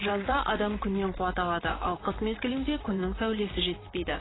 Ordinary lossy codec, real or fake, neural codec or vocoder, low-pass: AAC, 16 kbps; fake; vocoder, 44.1 kHz, 128 mel bands, Pupu-Vocoder; 7.2 kHz